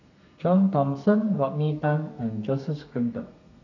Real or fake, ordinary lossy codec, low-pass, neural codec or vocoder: fake; none; 7.2 kHz; codec, 32 kHz, 1.9 kbps, SNAC